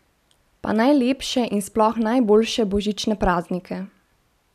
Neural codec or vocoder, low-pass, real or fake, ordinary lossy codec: none; 14.4 kHz; real; none